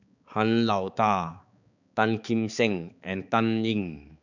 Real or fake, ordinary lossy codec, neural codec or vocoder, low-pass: fake; none; codec, 16 kHz, 4 kbps, X-Codec, HuBERT features, trained on balanced general audio; 7.2 kHz